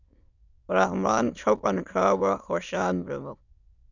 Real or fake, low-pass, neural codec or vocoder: fake; 7.2 kHz; autoencoder, 22.05 kHz, a latent of 192 numbers a frame, VITS, trained on many speakers